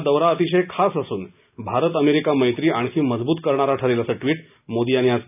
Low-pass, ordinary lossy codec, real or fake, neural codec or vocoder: 3.6 kHz; none; real; none